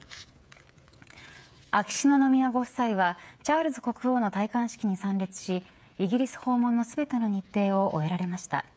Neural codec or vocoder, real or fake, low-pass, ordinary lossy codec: codec, 16 kHz, 8 kbps, FreqCodec, smaller model; fake; none; none